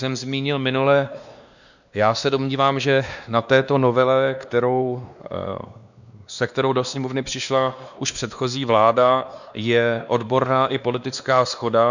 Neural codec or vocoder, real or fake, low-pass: codec, 16 kHz, 2 kbps, X-Codec, WavLM features, trained on Multilingual LibriSpeech; fake; 7.2 kHz